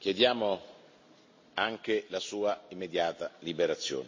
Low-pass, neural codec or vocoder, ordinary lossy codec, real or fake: 7.2 kHz; none; MP3, 32 kbps; real